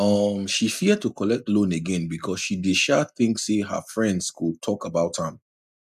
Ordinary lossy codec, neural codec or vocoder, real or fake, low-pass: none; none; real; 14.4 kHz